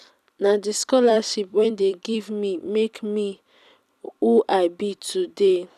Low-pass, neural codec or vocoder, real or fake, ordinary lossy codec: 14.4 kHz; vocoder, 44.1 kHz, 128 mel bands every 256 samples, BigVGAN v2; fake; none